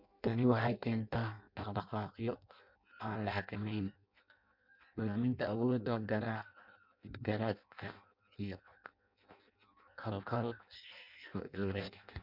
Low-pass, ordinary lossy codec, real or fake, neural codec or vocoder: 5.4 kHz; MP3, 48 kbps; fake; codec, 16 kHz in and 24 kHz out, 0.6 kbps, FireRedTTS-2 codec